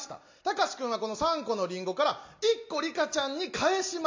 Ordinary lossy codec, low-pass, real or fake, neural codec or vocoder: MP3, 48 kbps; 7.2 kHz; real; none